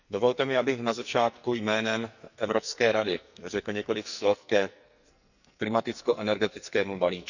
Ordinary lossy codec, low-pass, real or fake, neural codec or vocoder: none; 7.2 kHz; fake; codec, 44.1 kHz, 2.6 kbps, SNAC